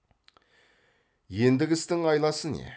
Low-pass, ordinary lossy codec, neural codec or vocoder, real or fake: none; none; none; real